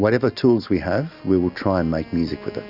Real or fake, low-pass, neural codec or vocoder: real; 5.4 kHz; none